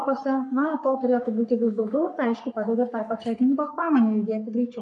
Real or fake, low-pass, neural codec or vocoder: fake; 10.8 kHz; codec, 44.1 kHz, 3.4 kbps, Pupu-Codec